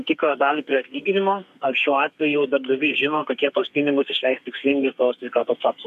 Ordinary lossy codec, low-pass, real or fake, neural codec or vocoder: AAC, 96 kbps; 14.4 kHz; fake; codec, 32 kHz, 1.9 kbps, SNAC